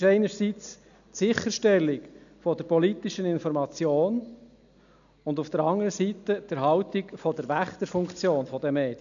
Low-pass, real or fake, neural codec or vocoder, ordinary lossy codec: 7.2 kHz; real; none; none